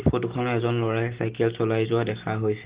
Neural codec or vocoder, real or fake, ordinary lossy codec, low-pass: none; real; Opus, 16 kbps; 3.6 kHz